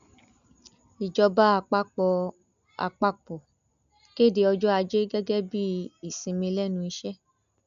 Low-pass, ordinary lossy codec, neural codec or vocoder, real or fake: 7.2 kHz; none; none; real